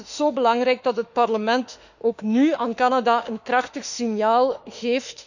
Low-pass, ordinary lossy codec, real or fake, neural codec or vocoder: 7.2 kHz; none; fake; autoencoder, 48 kHz, 32 numbers a frame, DAC-VAE, trained on Japanese speech